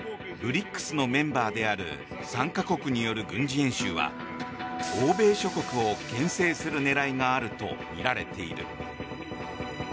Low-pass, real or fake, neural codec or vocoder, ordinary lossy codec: none; real; none; none